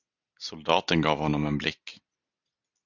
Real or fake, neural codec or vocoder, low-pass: real; none; 7.2 kHz